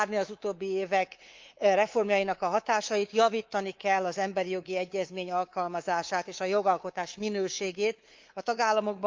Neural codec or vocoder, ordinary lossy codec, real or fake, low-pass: codec, 24 kHz, 3.1 kbps, DualCodec; Opus, 32 kbps; fake; 7.2 kHz